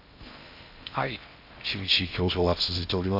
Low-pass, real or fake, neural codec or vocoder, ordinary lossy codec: 5.4 kHz; fake; codec, 16 kHz in and 24 kHz out, 0.6 kbps, FocalCodec, streaming, 4096 codes; none